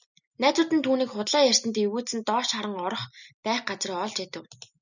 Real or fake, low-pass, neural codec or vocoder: real; 7.2 kHz; none